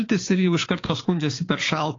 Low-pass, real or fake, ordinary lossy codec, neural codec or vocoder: 7.2 kHz; fake; AAC, 32 kbps; codec, 16 kHz, 6 kbps, DAC